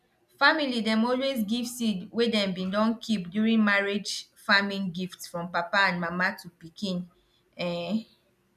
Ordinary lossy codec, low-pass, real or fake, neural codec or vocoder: none; 14.4 kHz; real; none